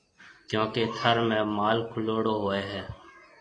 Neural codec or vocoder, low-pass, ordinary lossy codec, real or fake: none; 9.9 kHz; AAC, 32 kbps; real